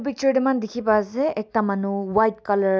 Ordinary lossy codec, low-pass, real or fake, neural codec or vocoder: none; none; real; none